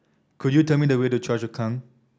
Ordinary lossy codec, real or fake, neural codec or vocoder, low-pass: none; real; none; none